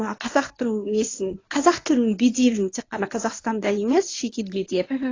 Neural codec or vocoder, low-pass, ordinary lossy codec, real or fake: codec, 24 kHz, 0.9 kbps, WavTokenizer, medium speech release version 1; 7.2 kHz; AAC, 32 kbps; fake